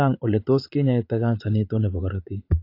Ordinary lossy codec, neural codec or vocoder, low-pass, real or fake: AAC, 32 kbps; vocoder, 44.1 kHz, 80 mel bands, Vocos; 5.4 kHz; fake